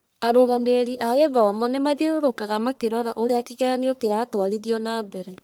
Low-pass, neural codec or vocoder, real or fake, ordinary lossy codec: none; codec, 44.1 kHz, 1.7 kbps, Pupu-Codec; fake; none